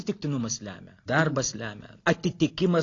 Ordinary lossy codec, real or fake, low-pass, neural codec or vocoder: AAC, 32 kbps; real; 7.2 kHz; none